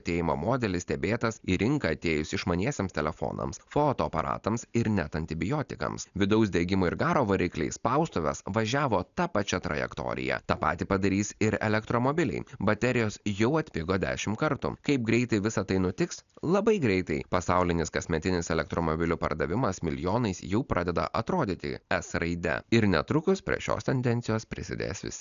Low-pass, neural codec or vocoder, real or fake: 7.2 kHz; none; real